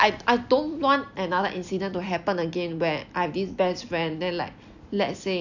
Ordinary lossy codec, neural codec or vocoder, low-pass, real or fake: none; none; 7.2 kHz; real